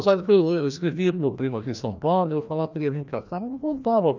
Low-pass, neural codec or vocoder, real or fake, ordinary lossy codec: 7.2 kHz; codec, 16 kHz, 1 kbps, FreqCodec, larger model; fake; none